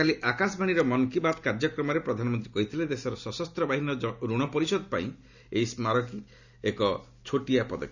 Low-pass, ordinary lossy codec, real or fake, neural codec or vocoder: 7.2 kHz; none; real; none